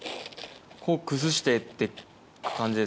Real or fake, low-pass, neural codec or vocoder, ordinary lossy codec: real; none; none; none